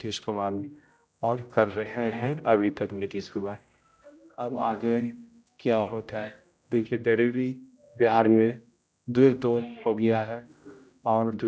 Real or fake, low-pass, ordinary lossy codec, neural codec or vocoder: fake; none; none; codec, 16 kHz, 0.5 kbps, X-Codec, HuBERT features, trained on general audio